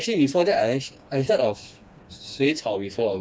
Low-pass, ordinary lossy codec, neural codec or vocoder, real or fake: none; none; codec, 16 kHz, 2 kbps, FreqCodec, smaller model; fake